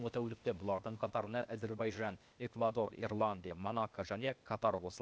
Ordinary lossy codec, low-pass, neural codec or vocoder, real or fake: none; none; codec, 16 kHz, 0.8 kbps, ZipCodec; fake